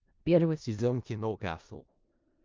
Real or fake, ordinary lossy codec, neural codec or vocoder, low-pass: fake; Opus, 24 kbps; codec, 16 kHz in and 24 kHz out, 0.4 kbps, LongCat-Audio-Codec, four codebook decoder; 7.2 kHz